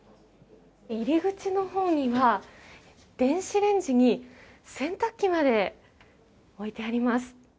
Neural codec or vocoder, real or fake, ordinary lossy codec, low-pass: none; real; none; none